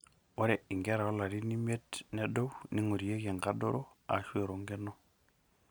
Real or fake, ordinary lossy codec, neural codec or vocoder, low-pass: real; none; none; none